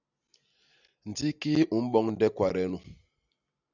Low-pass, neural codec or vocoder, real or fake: 7.2 kHz; none; real